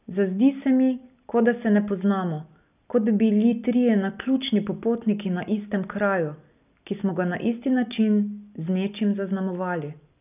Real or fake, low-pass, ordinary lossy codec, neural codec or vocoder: real; 3.6 kHz; none; none